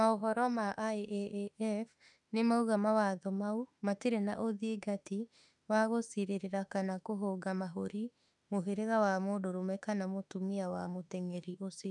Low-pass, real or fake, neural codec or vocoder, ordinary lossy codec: 10.8 kHz; fake; autoencoder, 48 kHz, 32 numbers a frame, DAC-VAE, trained on Japanese speech; none